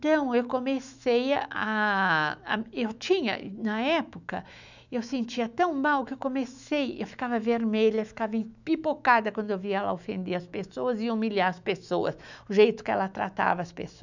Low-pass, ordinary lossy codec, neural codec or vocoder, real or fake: 7.2 kHz; none; autoencoder, 48 kHz, 128 numbers a frame, DAC-VAE, trained on Japanese speech; fake